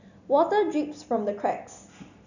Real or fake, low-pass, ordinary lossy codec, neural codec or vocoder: real; 7.2 kHz; none; none